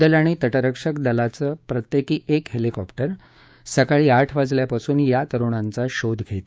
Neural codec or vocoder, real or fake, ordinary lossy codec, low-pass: codec, 16 kHz, 6 kbps, DAC; fake; none; none